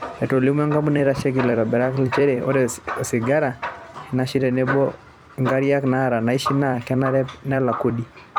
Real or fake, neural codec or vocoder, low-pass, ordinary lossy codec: fake; vocoder, 44.1 kHz, 128 mel bands every 256 samples, BigVGAN v2; 19.8 kHz; none